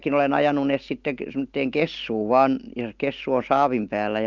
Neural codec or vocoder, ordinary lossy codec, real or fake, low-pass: none; Opus, 32 kbps; real; 7.2 kHz